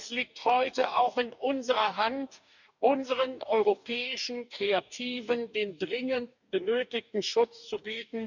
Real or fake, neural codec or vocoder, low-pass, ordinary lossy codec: fake; codec, 44.1 kHz, 2.6 kbps, DAC; 7.2 kHz; none